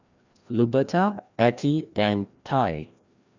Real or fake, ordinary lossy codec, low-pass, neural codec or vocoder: fake; Opus, 64 kbps; 7.2 kHz; codec, 16 kHz, 1 kbps, FreqCodec, larger model